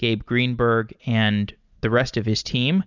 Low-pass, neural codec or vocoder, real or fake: 7.2 kHz; none; real